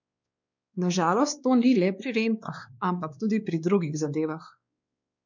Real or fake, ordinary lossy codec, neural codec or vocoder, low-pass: fake; none; codec, 16 kHz, 2 kbps, X-Codec, WavLM features, trained on Multilingual LibriSpeech; 7.2 kHz